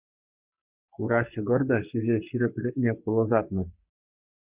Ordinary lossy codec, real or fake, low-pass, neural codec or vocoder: Opus, 64 kbps; fake; 3.6 kHz; codec, 44.1 kHz, 3.4 kbps, Pupu-Codec